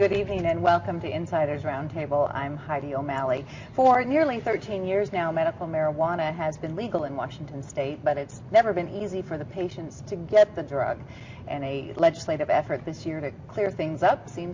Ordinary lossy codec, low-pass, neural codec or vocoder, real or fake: MP3, 48 kbps; 7.2 kHz; none; real